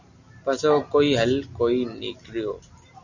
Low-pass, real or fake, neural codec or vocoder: 7.2 kHz; real; none